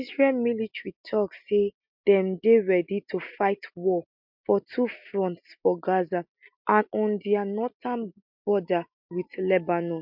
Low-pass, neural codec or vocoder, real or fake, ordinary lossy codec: 5.4 kHz; none; real; MP3, 48 kbps